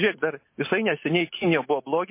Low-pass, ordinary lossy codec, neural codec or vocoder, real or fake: 3.6 kHz; MP3, 24 kbps; none; real